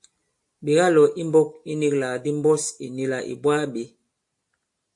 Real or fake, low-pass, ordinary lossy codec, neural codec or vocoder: real; 10.8 kHz; AAC, 64 kbps; none